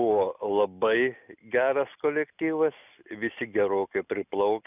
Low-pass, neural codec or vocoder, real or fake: 3.6 kHz; none; real